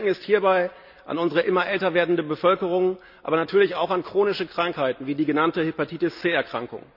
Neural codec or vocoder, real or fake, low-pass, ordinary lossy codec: none; real; 5.4 kHz; none